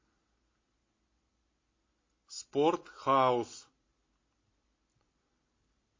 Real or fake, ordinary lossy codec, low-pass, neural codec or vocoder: real; MP3, 32 kbps; 7.2 kHz; none